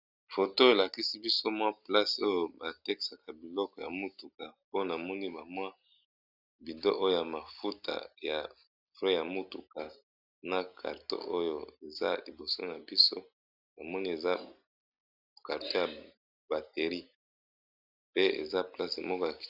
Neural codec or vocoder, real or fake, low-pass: none; real; 5.4 kHz